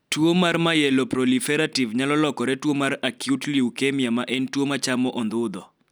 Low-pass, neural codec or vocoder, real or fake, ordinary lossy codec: none; none; real; none